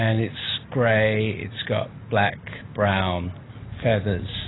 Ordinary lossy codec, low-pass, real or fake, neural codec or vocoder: AAC, 16 kbps; 7.2 kHz; real; none